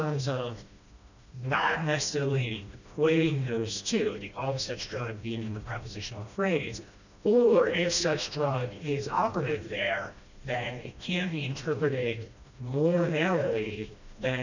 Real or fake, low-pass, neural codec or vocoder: fake; 7.2 kHz; codec, 16 kHz, 1 kbps, FreqCodec, smaller model